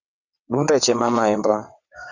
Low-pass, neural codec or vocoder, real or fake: 7.2 kHz; vocoder, 22.05 kHz, 80 mel bands, WaveNeXt; fake